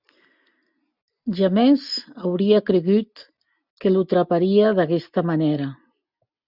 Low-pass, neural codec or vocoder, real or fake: 5.4 kHz; none; real